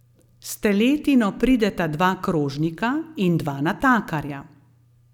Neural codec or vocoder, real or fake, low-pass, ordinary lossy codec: none; real; 19.8 kHz; none